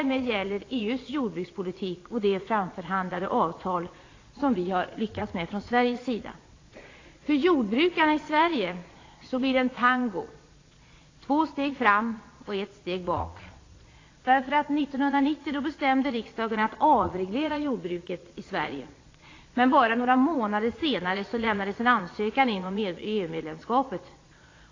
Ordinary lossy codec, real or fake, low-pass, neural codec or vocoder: AAC, 32 kbps; fake; 7.2 kHz; vocoder, 22.05 kHz, 80 mel bands, Vocos